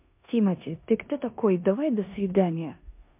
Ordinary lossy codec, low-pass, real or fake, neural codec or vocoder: MP3, 32 kbps; 3.6 kHz; fake; codec, 16 kHz in and 24 kHz out, 0.9 kbps, LongCat-Audio-Codec, four codebook decoder